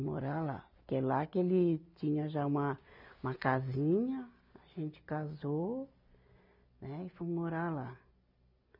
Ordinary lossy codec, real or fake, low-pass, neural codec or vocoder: none; real; 5.4 kHz; none